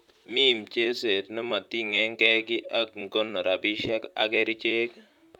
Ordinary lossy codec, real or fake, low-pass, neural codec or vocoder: none; fake; 19.8 kHz; vocoder, 44.1 kHz, 128 mel bands, Pupu-Vocoder